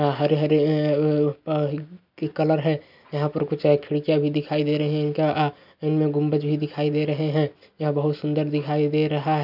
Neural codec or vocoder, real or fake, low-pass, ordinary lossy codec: none; real; 5.4 kHz; none